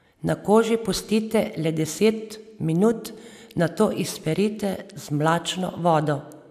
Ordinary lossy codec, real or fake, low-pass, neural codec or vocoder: AAC, 96 kbps; real; 14.4 kHz; none